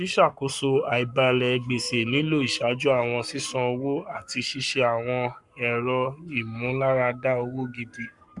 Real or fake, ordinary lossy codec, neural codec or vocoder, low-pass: fake; none; codec, 44.1 kHz, 7.8 kbps, Pupu-Codec; 10.8 kHz